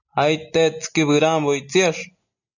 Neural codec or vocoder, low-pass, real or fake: none; 7.2 kHz; real